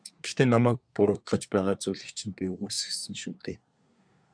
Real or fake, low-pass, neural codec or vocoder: fake; 9.9 kHz; codec, 24 kHz, 1 kbps, SNAC